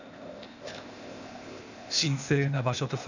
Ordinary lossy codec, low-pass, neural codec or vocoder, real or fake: none; 7.2 kHz; codec, 16 kHz, 0.8 kbps, ZipCodec; fake